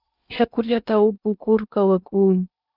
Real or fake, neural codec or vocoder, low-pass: fake; codec, 16 kHz in and 24 kHz out, 0.8 kbps, FocalCodec, streaming, 65536 codes; 5.4 kHz